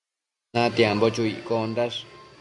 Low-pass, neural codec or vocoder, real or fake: 10.8 kHz; none; real